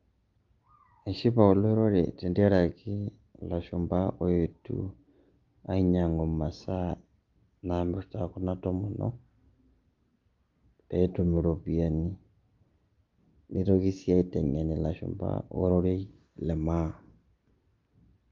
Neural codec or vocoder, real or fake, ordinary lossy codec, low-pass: none; real; Opus, 32 kbps; 7.2 kHz